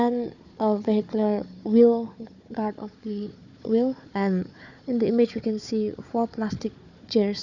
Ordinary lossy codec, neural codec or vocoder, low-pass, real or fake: none; codec, 16 kHz, 4 kbps, FunCodec, trained on Chinese and English, 50 frames a second; 7.2 kHz; fake